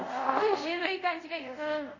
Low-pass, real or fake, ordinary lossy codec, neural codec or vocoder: 7.2 kHz; fake; none; codec, 24 kHz, 0.5 kbps, DualCodec